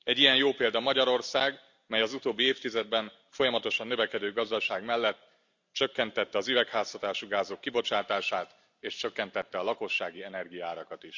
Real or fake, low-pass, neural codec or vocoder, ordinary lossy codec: real; 7.2 kHz; none; Opus, 64 kbps